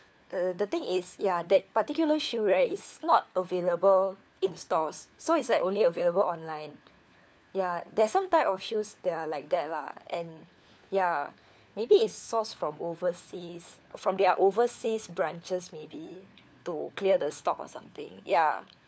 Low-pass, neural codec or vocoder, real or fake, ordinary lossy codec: none; codec, 16 kHz, 4 kbps, FunCodec, trained on LibriTTS, 50 frames a second; fake; none